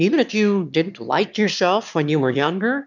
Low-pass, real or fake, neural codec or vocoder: 7.2 kHz; fake; autoencoder, 22.05 kHz, a latent of 192 numbers a frame, VITS, trained on one speaker